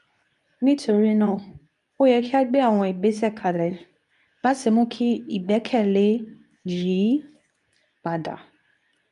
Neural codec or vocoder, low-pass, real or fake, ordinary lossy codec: codec, 24 kHz, 0.9 kbps, WavTokenizer, medium speech release version 2; 10.8 kHz; fake; MP3, 96 kbps